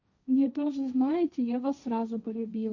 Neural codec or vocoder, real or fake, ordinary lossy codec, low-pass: codec, 16 kHz, 1.1 kbps, Voila-Tokenizer; fake; none; 7.2 kHz